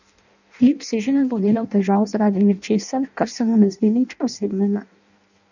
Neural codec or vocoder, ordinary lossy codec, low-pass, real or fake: codec, 16 kHz in and 24 kHz out, 0.6 kbps, FireRedTTS-2 codec; none; 7.2 kHz; fake